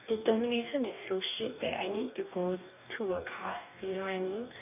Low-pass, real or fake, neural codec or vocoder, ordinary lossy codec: 3.6 kHz; fake; codec, 44.1 kHz, 2.6 kbps, DAC; none